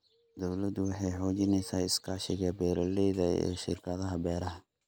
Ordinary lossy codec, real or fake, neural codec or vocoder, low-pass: none; real; none; none